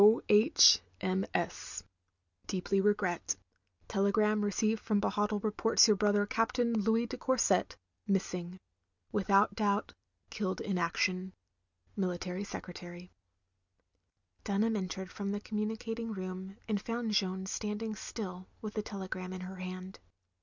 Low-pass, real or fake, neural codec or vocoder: 7.2 kHz; real; none